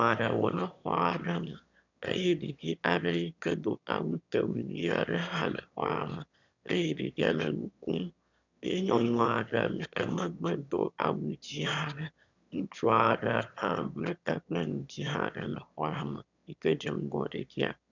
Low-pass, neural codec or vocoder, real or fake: 7.2 kHz; autoencoder, 22.05 kHz, a latent of 192 numbers a frame, VITS, trained on one speaker; fake